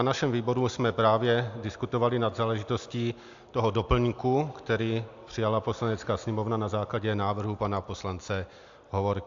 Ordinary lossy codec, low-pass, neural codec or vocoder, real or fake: AAC, 64 kbps; 7.2 kHz; none; real